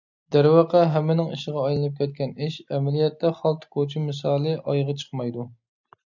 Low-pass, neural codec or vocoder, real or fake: 7.2 kHz; none; real